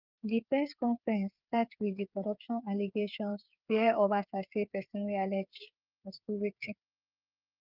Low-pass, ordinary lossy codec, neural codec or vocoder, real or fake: 5.4 kHz; Opus, 24 kbps; codec, 16 kHz, 8 kbps, FreqCodec, smaller model; fake